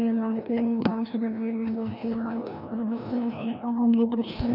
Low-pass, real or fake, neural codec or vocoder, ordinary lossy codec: 5.4 kHz; fake; codec, 16 kHz, 1 kbps, FreqCodec, larger model; none